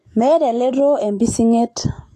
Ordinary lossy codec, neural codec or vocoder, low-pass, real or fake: AAC, 48 kbps; autoencoder, 48 kHz, 128 numbers a frame, DAC-VAE, trained on Japanese speech; 14.4 kHz; fake